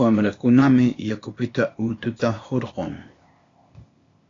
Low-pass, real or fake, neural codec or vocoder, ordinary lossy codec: 7.2 kHz; fake; codec, 16 kHz, 0.8 kbps, ZipCodec; AAC, 32 kbps